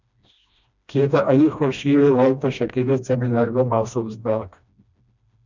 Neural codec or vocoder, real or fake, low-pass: codec, 16 kHz, 1 kbps, FreqCodec, smaller model; fake; 7.2 kHz